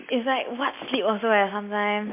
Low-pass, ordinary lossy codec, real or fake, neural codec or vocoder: 3.6 kHz; MP3, 24 kbps; real; none